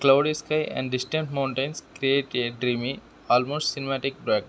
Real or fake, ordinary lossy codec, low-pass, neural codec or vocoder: real; none; none; none